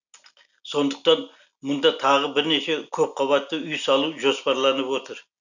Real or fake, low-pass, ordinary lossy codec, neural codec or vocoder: real; 7.2 kHz; none; none